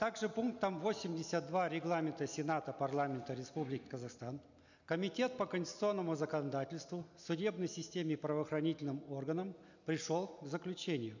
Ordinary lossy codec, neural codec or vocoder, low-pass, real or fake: none; none; 7.2 kHz; real